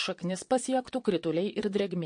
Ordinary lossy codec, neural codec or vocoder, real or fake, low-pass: MP3, 48 kbps; vocoder, 22.05 kHz, 80 mel bands, Vocos; fake; 9.9 kHz